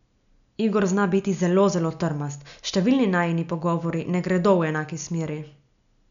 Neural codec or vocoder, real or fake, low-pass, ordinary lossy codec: none; real; 7.2 kHz; none